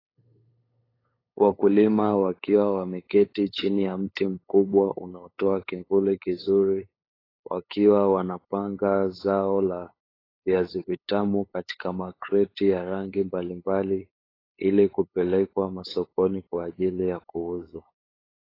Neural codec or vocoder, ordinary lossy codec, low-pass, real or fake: codec, 16 kHz, 8 kbps, FunCodec, trained on LibriTTS, 25 frames a second; AAC, 24 kbps; 5.4 kHz; fake